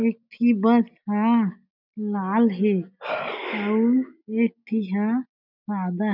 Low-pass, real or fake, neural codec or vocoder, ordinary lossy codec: 5.4 kHz; real; none; none